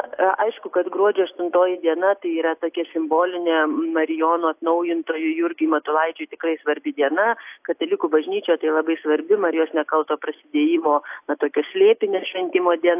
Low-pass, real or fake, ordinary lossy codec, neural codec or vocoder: 3.6 kHz; real; AAC, 32 kbps; none